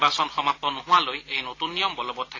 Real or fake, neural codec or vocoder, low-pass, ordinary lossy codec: real; none; 7.2 kHz; AAC, 32 kbps